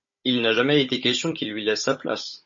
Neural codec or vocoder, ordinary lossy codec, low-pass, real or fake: codec, 16 kHz, 16 kbps, FunCodec, trained on Chinese and English, 50 frames a second; MP3, 32 kbps; 7.2 kHz; fake